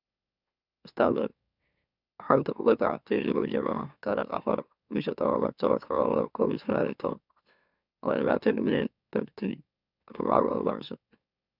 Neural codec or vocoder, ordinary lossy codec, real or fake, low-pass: autoencoder, 44.1 kHz, a latent of 192 numbers a frame, MeloTTS; none; fake; 5.4 kHz